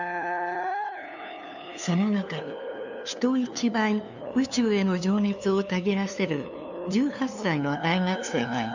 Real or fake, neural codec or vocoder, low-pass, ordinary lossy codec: fake; codec, 16 kHz, 2 kbps, FunCodec, trained on LibriTTS, 25 frames a second; 7.2 kHz; none